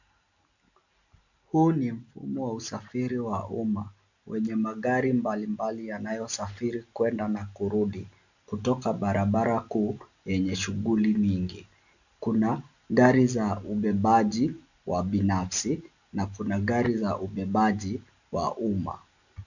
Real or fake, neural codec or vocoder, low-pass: real; none; 7.2 kHz